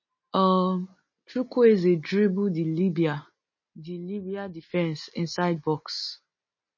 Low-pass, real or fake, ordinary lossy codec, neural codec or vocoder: 7.2 kHz; real; MP3, 32 kbps; none